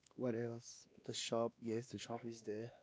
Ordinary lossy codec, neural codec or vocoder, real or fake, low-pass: none; codec, 16 kHz, 2 kbps, X-Codec, WavLM features, trained on Multilingual LibriSpeech; fake; none